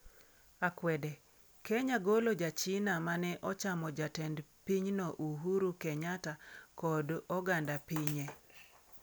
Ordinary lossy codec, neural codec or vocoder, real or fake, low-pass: none; none; real; none